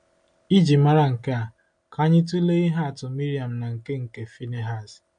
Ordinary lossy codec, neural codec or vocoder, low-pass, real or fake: MP3, 48 kbps; none; 9.9 kHz; real